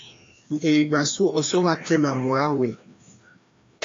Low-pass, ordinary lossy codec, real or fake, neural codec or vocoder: 7.2 kHz; AAC, 48 kbps; fake; codec, 16 kHz, 1 kbps, FreqCodec, larger model